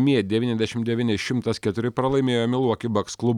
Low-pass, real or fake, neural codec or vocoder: 19.8 kHz; real; none